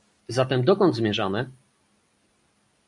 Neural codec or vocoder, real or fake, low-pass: none; real; 10.8 kHz